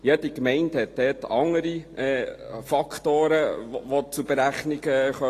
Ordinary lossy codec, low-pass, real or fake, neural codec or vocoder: AAC, 48 kbps; 14.4 kHz; real; none